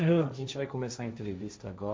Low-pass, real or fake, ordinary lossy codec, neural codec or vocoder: none; fake; none; codec, 16 kHz, 1.1 kbps, Voila-Tokenizer